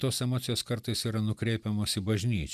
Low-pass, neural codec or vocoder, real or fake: 14.4 kHz; vocoder, 44.1 kHz, 128 mel bands every 256 samples, BigVGAN v2; fake